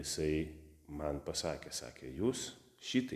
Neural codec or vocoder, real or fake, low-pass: none; real; 14.4 kHz